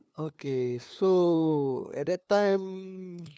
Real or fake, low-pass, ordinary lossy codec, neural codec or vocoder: fake; none; none; codec, 16 kHz, 2 kbps, FunCodec, trained on LibriTTS, 25 frames a second